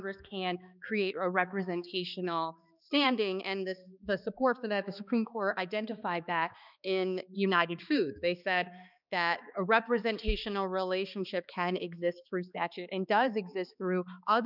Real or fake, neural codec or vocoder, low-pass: fake; codec, 16 kHz, 2 kbps, X-Codec, HuBERT features, trained on balanced general audio; 5.4 kHz